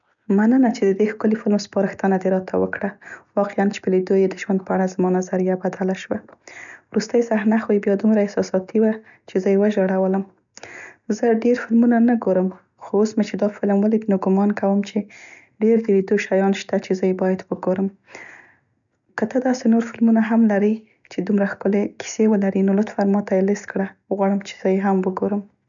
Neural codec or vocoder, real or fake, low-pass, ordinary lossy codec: none; real; 7.2 kHz; none